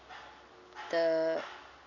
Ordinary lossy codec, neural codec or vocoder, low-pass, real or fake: none; none; 7.2 kHz; real